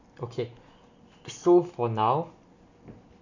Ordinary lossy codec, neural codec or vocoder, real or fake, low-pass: none; none; real; 7.2 kHz